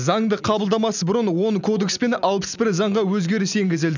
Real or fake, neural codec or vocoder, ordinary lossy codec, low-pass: real; none; none; 7.2 kHz